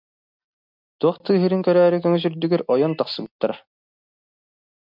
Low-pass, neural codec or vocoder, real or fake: 5.4 kHz; none; real